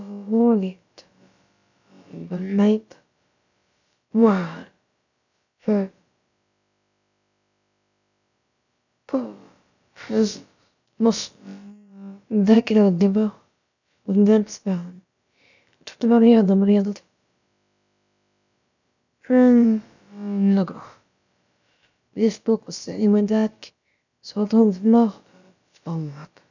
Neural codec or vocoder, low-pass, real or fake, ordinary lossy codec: codec, 16 kHz, about 1 kbps, DyCAST, with the encoder's durations; 7.2 kHz; fake; none